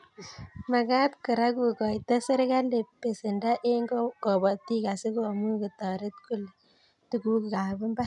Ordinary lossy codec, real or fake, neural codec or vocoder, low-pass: none; real; none; 10.8 kHz